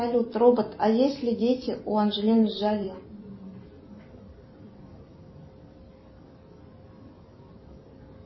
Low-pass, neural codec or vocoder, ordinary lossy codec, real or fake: 7.2 kHz; vocoder, 44.1 kHz, 128 mel bands every 256 samples, BigVGAN v2; MP3, 24 kbps; fake